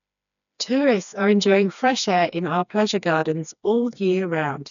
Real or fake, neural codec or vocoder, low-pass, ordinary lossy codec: fake; codec, 16 kHz, 2 kbps, FreqCodec, smaller model; 7.2 kHz; none